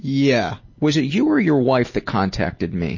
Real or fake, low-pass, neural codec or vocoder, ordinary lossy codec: real; 7.2 kHz; none; MP3, 32 kbps